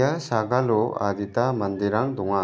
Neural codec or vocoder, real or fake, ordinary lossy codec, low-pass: none; real; none; none